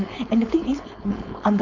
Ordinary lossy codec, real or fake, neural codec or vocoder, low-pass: none; fake; codec, 16 kHz, 4.8 kbps, FACodec; 7.2 kHz